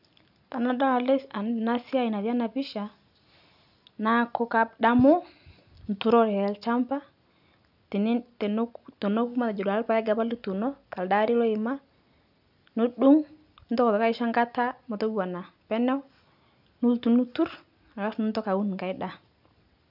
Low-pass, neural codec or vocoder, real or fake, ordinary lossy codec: 5.4 kHz; none; real; none